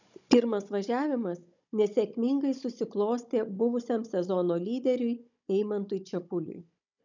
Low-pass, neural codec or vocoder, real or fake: 7.2 kHz; codec, 16 kHz, 16 kbps, FunCodec, trained on Chinese and English, 50 frames a second; fake